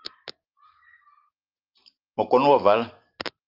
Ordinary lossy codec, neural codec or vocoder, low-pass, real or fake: Opus, 24 kbps; none; 5.4 kHz; real